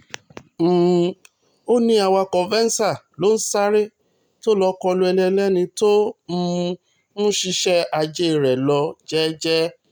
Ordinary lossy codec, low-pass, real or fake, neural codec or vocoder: none; none; real; none